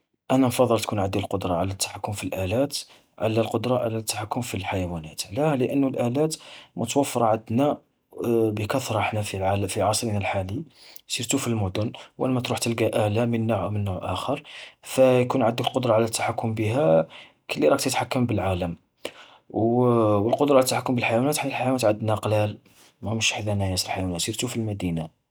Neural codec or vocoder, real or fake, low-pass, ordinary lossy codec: none; real; none; none